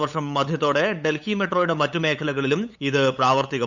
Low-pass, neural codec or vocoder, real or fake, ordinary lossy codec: 7.2 kHz; codec, 16 kHz, 8 kbps, FunCodec, trained on LibriTTS, 25 frames a second; fake; none